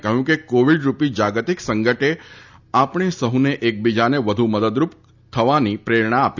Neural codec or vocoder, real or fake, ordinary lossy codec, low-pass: none; real; none; 7.2 kHz